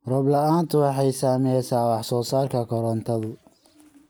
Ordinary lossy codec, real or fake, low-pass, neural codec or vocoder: none; real; none; none